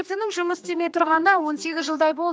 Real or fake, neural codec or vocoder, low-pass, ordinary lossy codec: fake; codec, 16 kHz, 2 kbps, X-Codec, HuBERT features, trained on general audio; none; none